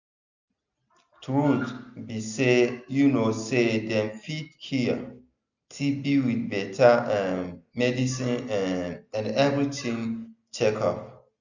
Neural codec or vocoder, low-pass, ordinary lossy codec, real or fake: none; 7.2 kHz; none; real